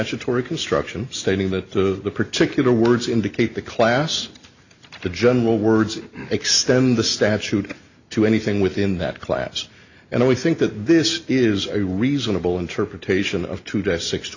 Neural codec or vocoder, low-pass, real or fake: none; 7.2 kHz; real